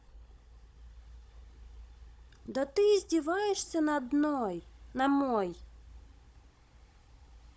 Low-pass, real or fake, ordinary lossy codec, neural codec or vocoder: none; fake; none; codec, 16 kHz, 16 kbps, FunCodec, trained on Chinese and English, 50 frames a second